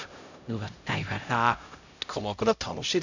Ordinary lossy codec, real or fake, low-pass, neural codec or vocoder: none; fake; 7.2 kHz; codec, 16 kHz, 0.5 kbps, X-Codec, HuBERT features, trained on LibriSpeech